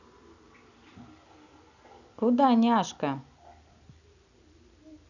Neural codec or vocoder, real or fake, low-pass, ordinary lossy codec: none; real; 7.2 kHz; none